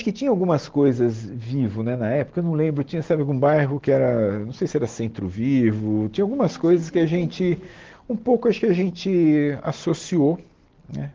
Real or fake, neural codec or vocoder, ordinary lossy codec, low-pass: real; none; Opus, 16 kbps; 7.2 kHz